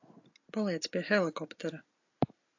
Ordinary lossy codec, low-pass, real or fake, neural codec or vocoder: AAC, 48 kbps; 7.2 kHz; real; none